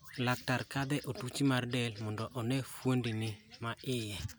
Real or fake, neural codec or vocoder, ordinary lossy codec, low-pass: real; none; none; none